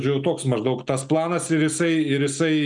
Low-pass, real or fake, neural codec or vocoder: 10.8 kHz; real; none